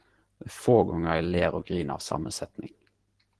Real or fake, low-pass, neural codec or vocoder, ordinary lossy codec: real; 10.8 kHz; none; Opus, 16 kbps